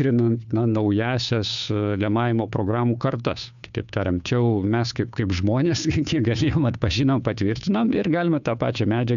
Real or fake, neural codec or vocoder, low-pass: fake; codec, 16 kHz, 2 kbps, FunCodec, trained on Chinese and English, 25 frames a second; 7.2 kHz